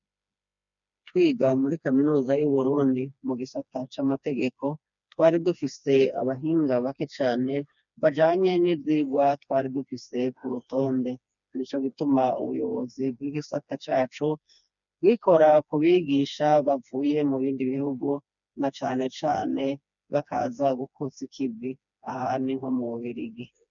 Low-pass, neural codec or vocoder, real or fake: 7.2 kHz; codec, 16 kHz, 2 kbps, FreqCodec, smaller model; fake